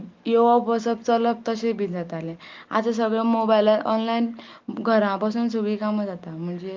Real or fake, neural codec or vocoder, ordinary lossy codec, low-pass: real; none; Opus, 24 kbps; 7.2 kHz